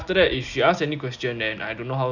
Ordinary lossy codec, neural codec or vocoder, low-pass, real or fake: none; none; 7.2 kHz; real